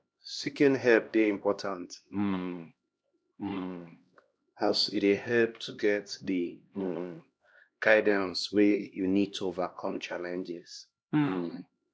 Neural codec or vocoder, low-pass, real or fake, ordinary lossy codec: codec, 16 kHz, 1 kbps, X-Codec, HuBERT features, trained on LibriSpeech; none; fake; none